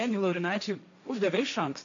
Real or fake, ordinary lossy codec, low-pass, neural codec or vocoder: fake; AAC, 48 kbps; 7.2 kHz; codec, 16 kHz, 1.1 kbps, Voila-Tokenizer